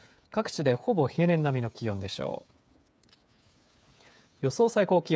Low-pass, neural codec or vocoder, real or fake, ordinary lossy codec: none; codec, 16 kHz, 8 kbps, FreqCodec, smaller model; fake; none